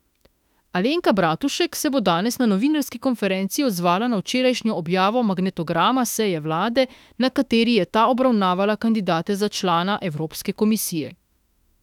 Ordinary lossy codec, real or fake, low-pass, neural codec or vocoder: none; fake; 19.8 kHz; autoencoder, 48 kHz, 32 numbers a frame, DAC-VAE, trained on Japanese speech